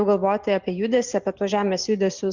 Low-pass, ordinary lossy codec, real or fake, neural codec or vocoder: 7.2 kHz; Opus, 64 kbps; real; none